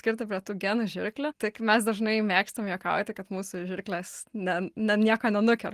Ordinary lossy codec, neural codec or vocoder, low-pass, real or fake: Opus, 24 kbps; none; 14.4 kHz; real